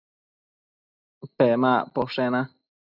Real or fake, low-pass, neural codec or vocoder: real; 5.4 kHz; none